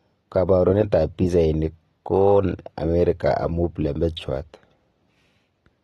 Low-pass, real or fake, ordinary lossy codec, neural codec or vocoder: 19.8 kHz; real; AAC, 32 kbps; none